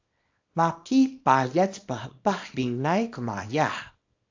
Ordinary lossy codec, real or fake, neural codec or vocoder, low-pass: AAC, 48 kbps; fake; codec, 24 kHz, 0.9 kbps, WavTokenizer, small release; 7.2 kHz